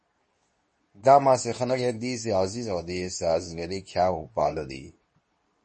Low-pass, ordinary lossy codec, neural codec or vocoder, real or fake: 10.8 kHz; MP3, 32 kbps; codec, 24 kHz, 0.9 kbps, WavTokenizer, medium speech release version 2; fake